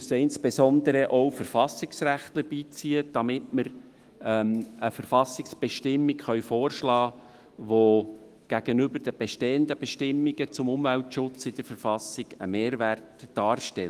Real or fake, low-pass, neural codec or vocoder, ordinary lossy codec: fake; 14.4 kHz; autoencoder, 48 kHz, 128 numbers a frame, DAC-VAE, trained on Japanese speech; Opus, 24 kbps